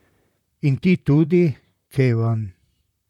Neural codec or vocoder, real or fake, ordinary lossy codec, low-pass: vocoder, 44.1 kHz, 128 mel bands, Pupu-Vocoder; fake; none; 19.8 kHz